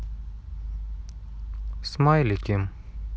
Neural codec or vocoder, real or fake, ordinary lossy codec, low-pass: none; real; none; none